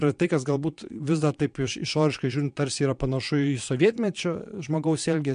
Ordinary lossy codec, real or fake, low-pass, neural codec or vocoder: MP3, 64 kbps; fake; 9.9 kHz; vocoder, 22.05 kHz, 80 mel bands, Vocos